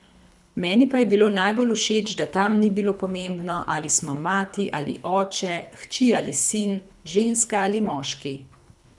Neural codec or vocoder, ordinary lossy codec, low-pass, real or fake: codec, 24 kHz, 3 kbps, HILCodec; none; none; fake